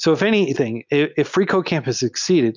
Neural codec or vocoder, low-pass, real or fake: none; 7.2 kHz; real